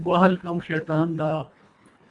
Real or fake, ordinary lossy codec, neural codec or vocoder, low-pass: fake; Opus, 64 kbps; codec, 24 kHz, 1.5 kbps, HILCodec; 10.8 kHz